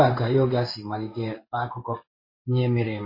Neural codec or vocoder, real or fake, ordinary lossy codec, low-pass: codec, 16 kHz in and 24 kHz out, 1 kbps, XY-Tokenizer; fake; MP3, 24 kbps; 5.4 kHz